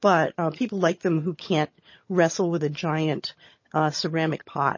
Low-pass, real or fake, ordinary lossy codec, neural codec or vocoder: 7.2 kHz; fake; MP3, 32 kbps; vocoder, 22.05 kHz, 80 mel bands, HiFi-GAN